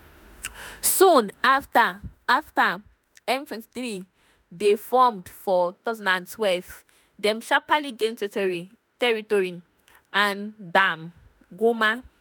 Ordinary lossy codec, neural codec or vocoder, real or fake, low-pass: none; autoencoder, 48 kHz, 32 numbers a frame, DAC-VAE, trained on Japanese speech; fake; none